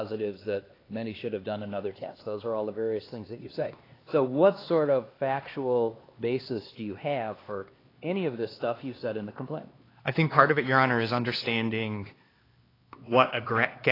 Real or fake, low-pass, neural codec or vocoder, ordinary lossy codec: fake; 5.4 kHz; codec, 16 kHz, 2 kbps, X-Codec, HuBERT features, trained on LibriSpeech; AAC, 24 kbps